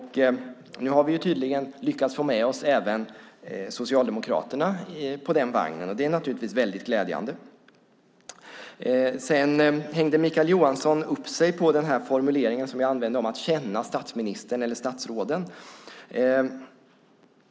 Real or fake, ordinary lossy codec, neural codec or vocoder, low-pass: real; none; none; none